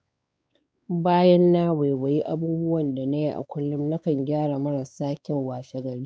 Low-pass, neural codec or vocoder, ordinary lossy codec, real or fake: none; codec, 16 kHz, 4 kbps, X-Codec, WavLM features, trained on Multilingual LibriSpeech; none; fake